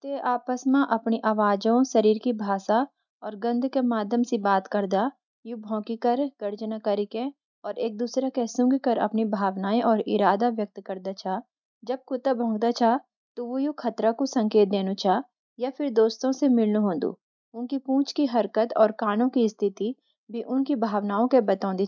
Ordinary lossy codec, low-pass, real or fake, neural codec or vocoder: none; 7.2 kHz; real; none